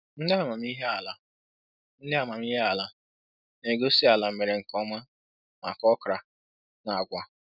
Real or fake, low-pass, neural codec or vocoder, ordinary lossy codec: real; 5.4 kHz; none; none